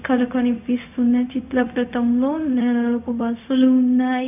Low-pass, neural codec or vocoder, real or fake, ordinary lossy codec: 3.6 kHz; codec, 16 kHz, 0.4 kbps, LongCat-Audio-Codec; fake; none